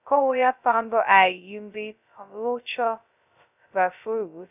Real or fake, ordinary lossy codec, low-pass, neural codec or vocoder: fake; none; 3.6 kHz; codec, 16 kHz, 0.2 kbps, FocalCodec